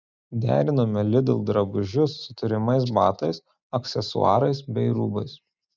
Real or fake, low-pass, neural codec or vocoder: real; 7.2 kHz; none